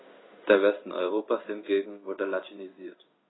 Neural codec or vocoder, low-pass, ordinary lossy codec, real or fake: codec, 16 kHz in and 24 kHz out, 1 kbps, XY-Tokenizer; 7.2 kHz; AAC, 16 kbps; fake